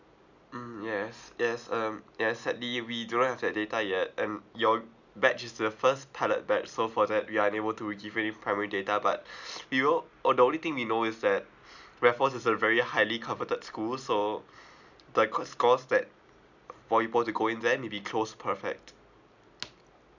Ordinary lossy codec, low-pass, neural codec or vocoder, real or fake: none; 7.2 kHz; none; real